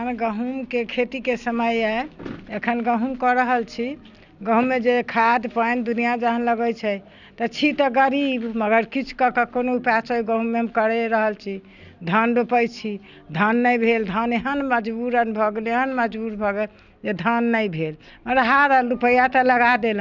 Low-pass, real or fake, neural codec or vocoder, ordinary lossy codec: 7.2 kHz; real; none; none